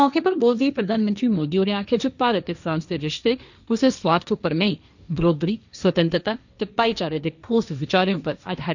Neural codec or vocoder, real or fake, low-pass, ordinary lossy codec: codec, 16 kHz, 1.1 kbps, Voila-Tokenizer; fake; 7.2 kHz; none